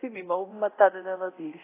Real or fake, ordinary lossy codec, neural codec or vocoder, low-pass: fake; AAC, 24 kbps; codec, 24 kHz, 0.9 kbps, DualCodec; 3.6 kHz